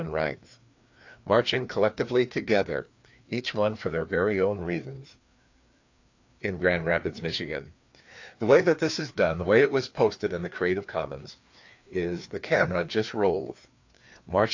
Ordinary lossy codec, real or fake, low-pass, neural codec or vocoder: AAC, 48 kbps; fake; 7.2 kHz; codec, 16 kHz, 2 kbps, FreqCodec, larger model